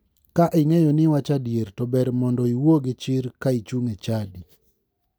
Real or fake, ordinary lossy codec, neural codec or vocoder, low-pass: real; none; none; none